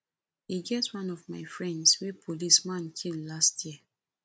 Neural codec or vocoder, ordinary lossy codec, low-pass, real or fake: none; none; none; real